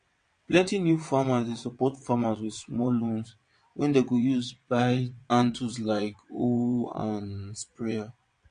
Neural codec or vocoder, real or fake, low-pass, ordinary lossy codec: vocoder, 22.05 kHz, 80 mel bands, WaveNeXt; fake; 9.9 kHz; MP3, 48 kbps